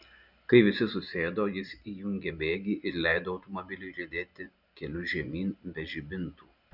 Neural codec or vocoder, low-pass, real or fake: none; 5.4 kHz; real